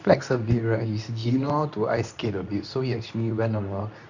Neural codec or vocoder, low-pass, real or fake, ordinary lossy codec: codec, 24 kHz, 0.9 kbps, WavTokenizer, medium speech release version 2; 7.2 kHz; fake; none